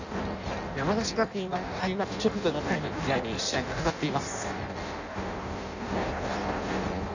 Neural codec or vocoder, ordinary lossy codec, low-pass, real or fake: codec, 16 kHz in and 24 kHz out, 0.6 kbps, FireRedTTS-2 codec; none; 7.2 kHz; fake